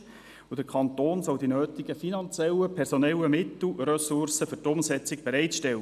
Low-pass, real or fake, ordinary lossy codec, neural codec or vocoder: 14.4 kHz; real; none; none